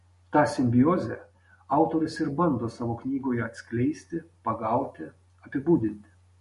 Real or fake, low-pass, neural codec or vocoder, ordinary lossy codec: real; 14.4 kHz; none; MP3, 48 kbps